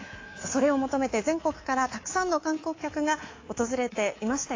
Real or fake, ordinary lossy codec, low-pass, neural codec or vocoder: fake; AAC, 32 kbps; 7.2 kHz; codec, 24 kHz, 3.1 kbps, DualCodec